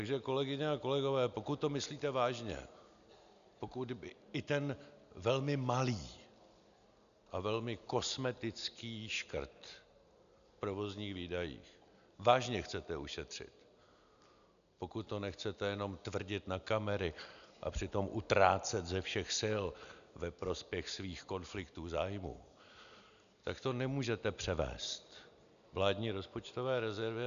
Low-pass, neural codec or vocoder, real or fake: 7.2 kHz; none; real